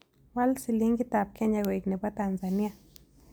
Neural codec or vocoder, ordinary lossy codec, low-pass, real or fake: none; none; none; real